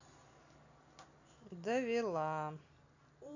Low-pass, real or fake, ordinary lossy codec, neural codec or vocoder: 7.2 kHz; real; none; none